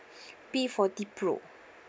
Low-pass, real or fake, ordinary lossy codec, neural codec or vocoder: none; real; none; none